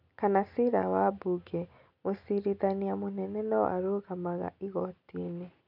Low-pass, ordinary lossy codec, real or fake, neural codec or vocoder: 5.4 kHz; none; real; none